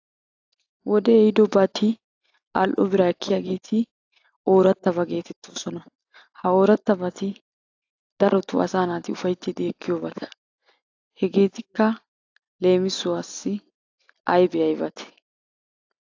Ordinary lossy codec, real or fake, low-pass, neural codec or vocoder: AAC, 48 kbps; real; 7.2 kHz; none